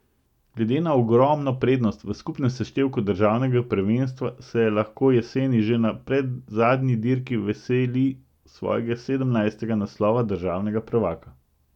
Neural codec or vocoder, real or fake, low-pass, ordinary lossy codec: none; real; 19.8 kHz; none